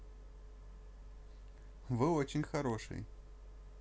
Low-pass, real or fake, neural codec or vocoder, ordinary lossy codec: none; real; none; none